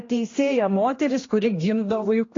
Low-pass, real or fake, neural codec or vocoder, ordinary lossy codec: 7.2 kHz; fake; codec, 16 kHz, 1 kbps, X-Codec, HuBERT features, trained on general audio; AAC, 32 kbps